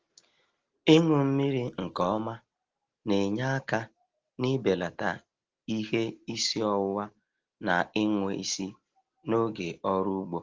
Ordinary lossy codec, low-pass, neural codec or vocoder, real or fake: Opus, 16 kbps; 7.2 kHz; none; real